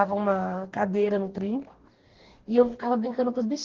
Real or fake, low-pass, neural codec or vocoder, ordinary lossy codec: fake; 7.2 kHz; codec, 44.1 kHz, 2.6 kbps, DAC; Opus, 16 kbps